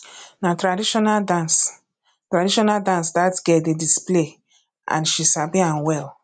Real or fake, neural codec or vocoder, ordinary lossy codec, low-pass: real; none; none; 9.9 kHz